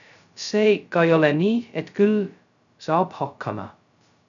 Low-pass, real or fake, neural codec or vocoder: 7.2 kHz; fake; codec, 16 kHz, 0.2 kbps, FocalCodec